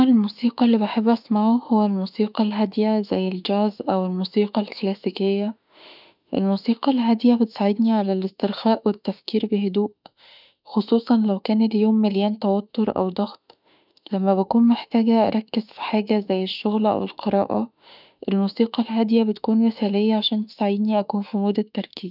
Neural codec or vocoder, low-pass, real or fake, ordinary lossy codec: autoencoder, 48 kHz, 32 numbers a frame, DAC-VAE, trained on Japanese speech; 5.4 kHz; fake; none